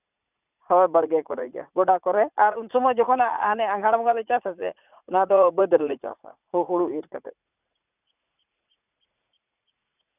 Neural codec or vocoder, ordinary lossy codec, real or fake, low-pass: vocoder, 44.1 kHz, 80 mel bands, Vocos; none; fake; 3.6 kHz